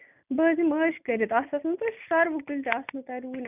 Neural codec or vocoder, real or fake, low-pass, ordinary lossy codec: none; real; 3.6 kHz; none